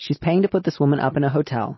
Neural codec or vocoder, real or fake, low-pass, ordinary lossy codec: none; real; 7.2 kHz; MP3, 24 kbps